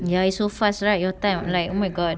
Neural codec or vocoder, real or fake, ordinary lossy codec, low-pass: none; real; none; none